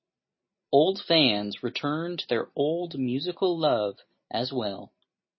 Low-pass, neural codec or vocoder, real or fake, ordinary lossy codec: 7.2 kHz; none; real; MP3, 24 kbps